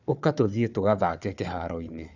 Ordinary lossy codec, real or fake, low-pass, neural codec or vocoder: none; fake; 7.2 kHz; codec, 16 kHz, 4 kbps, FunCodec, trained on Chinese and English, 50 frames a second